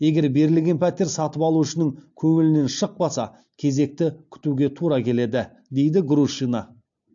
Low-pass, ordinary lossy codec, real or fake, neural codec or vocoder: 7.2 kHz; AAC, 64 kbps; real; none